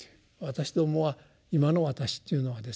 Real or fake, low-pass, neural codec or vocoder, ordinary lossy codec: real; none; none; none